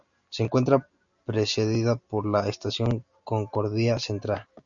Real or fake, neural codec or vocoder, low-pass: real; none; 7.2 kHz